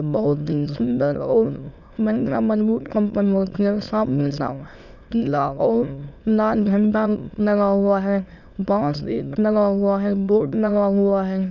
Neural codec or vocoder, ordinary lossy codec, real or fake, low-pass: autoencoder, 22.05 kHz, a latent of 192 numbers a frame, VITS, trained on many speakers; none; fake; 7.2 kHz